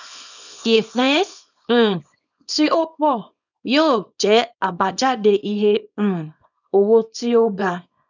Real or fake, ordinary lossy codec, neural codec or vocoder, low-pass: fake; none; codec, 24 kHz, 0.9 kbps, WavTokenizer, small release; 7.2 kHz